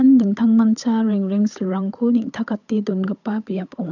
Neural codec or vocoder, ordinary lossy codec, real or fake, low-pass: codec, 16 kHz, 8 kbps, FunCodec, trained on Chinese and English, 25 frames a second; none; fake; 7.2 kHz